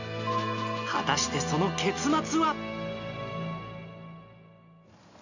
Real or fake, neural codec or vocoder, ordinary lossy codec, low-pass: real; none; none; 7.2 kHz